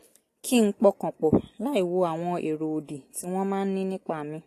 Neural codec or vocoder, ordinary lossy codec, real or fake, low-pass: none; AAC, 48 kbps; real; 14.4 kHz